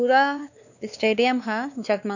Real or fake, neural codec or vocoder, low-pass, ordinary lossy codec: fake; codec, 16 kHz, 2 kbps, X-Codec, WavLM features, trained on Multilingual LibriSpeech; 7.2 kHz; none